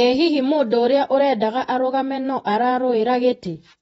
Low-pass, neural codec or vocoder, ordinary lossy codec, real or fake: 19.8 kHz; vocoder, 48 kHz, 128 mel bands, Vocos; AAC, 24 kbps; fake